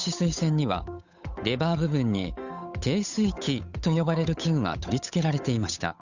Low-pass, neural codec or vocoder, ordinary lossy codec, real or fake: 7.2 kHz; codec, 16 kHz, 8 kbps, FunCodec, trained on Chinese and English, 25 frames a second; none; fake